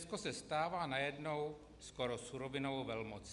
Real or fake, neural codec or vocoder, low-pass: real; none; 10.8 kHz